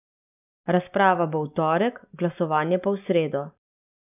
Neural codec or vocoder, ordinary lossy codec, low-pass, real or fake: none; none; 3.6 kHz; real